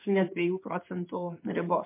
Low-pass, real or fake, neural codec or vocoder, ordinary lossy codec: 3.6 kHz; fake; codec, 16 kHz in and 24 kHz out, 1 kbps, XY-Tokenizer; MP3, 24 kbps